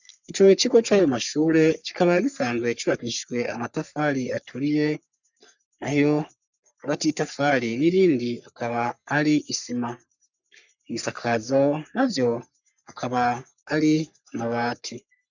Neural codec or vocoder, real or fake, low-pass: codec, 44.1 kHz, 3.4 kbps, Pupu-Codec; fake; 7.2 kHz